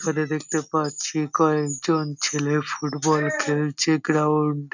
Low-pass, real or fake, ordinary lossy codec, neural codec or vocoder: 7.2 kHz; real; none; none